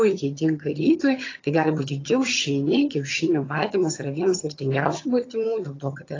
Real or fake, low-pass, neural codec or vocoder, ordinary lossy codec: fake; 7.2 kHz; vocoder, 22.05 kHz, 80 mel bands, HiFi-GAN; AAC, 32 kbps